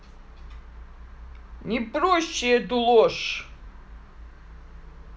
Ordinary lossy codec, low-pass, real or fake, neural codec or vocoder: none; none; real; none